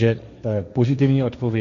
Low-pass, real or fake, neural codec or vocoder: 7.2 kHz; fake; codec, 16 kHz, 1.1 kbps, Voila-Tokenizer